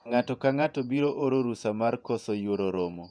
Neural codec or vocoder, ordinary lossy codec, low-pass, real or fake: vocoder, 48 kHz, 128 mel bands, Vocos; MP3, 96 kbps; 9.9 kHz; fake